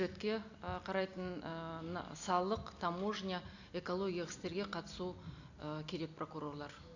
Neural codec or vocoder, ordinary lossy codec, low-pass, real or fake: none; none; 7.2 kHz; real